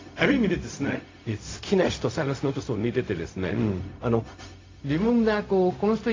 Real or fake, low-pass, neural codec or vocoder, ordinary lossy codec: fake; 7.2 kHz; codec, 16 kHz, 0.4 kbps, LongCat-Audio-Codec; AAC, 32 kbps